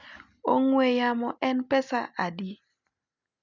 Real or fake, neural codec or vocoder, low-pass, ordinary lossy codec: real; none; 7.2 kHz; none